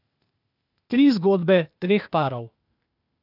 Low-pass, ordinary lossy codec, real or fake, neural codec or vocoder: 5.4 kHz; none; fake; codec, 16 kHz, 0.8 kbps, ZipCodec